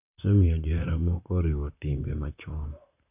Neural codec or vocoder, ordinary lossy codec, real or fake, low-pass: vocoder, 44.1 kHz, 128 mel bands, Pupu-Vocoder; none; fake; 3.6 kHz